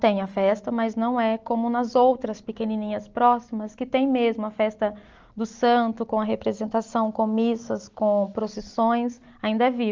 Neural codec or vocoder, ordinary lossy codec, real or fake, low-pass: none; Opus, 24 kbps; real; 7.2 kHz